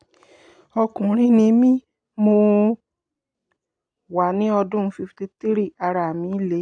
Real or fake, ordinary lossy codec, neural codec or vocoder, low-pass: real; AAC, 64 kbps; none; 9.9 kHz